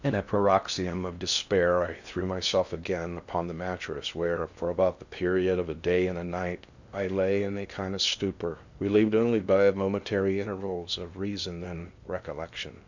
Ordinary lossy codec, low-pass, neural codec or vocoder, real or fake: Opus, 64 kbps; 7.2 kHz; codec, 16 kHz in and 24 kHz out, 0.6 kbps, FocalCodec, streaming, 4096 codes; fake